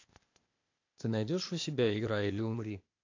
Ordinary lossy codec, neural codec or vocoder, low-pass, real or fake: AAC, 48 kbps; codec, 16 kHz, 0.8 kbps, ZipCodec; 7.2 kHz; fake